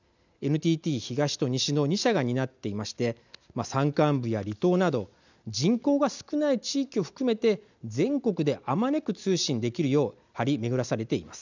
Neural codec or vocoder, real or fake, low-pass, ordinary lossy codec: none; real; 7.2 kHz; none